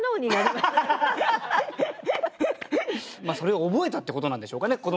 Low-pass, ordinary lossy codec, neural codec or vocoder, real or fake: none; none; none; real